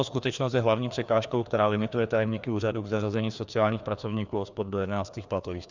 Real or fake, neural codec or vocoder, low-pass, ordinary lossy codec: fake; codec, 16 kHz, 2 kbps, FreqCodec, larger model; 7.2 kHz; Opus, 64 kbps